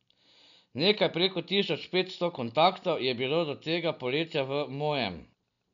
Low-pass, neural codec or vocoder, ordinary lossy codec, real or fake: 7.2 kHz; none; none; real